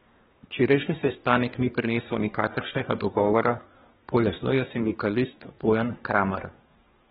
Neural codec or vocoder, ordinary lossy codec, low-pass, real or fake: codec, 24 kHz, 1 kbps, SNAC; AAC, 16 kbps; 10.8 kHz; fake